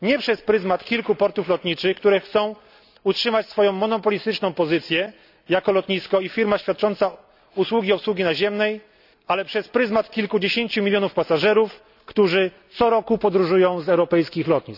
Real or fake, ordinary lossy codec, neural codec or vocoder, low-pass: real; none; none; 5.4 kHz